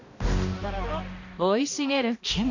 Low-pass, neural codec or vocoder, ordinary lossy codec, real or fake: 7.2 kHz; codec, 16 kHz, 1 kbps, X-Codec, HuBERT features, trained on balanced general audio; none; fake